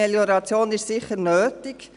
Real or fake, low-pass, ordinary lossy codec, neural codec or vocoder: real; 10.8 kHz; none; none